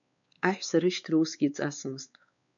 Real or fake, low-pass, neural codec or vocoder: fake; 7.2 kHz; codec, 16 kHz, 4 kbps, X-Codec, WavLM features, trained on Multilingual LibriSpeech